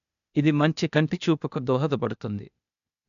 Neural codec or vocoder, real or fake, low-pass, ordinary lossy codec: codec, 16 kHz, 0.8 kbps, ZipCodec; fake; 7.2 kHz; none